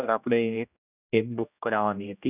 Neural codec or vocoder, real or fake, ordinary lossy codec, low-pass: codec, 16 kHz, 1 kbps, X-Codec, HuBERT features, trained on general audio; fake; none; 3.6 kHz